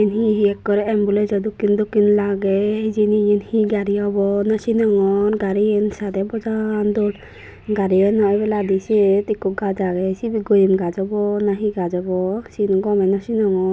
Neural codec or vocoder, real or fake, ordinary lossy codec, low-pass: none; real; none; none